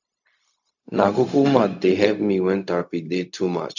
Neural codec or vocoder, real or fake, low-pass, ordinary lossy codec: codec, 16 kHz, 0.4 kbps, LongCat-Audio-Codec; fake; 7.2 kHz; none